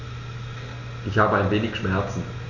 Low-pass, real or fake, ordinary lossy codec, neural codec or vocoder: 7.2 kHz; real; none; none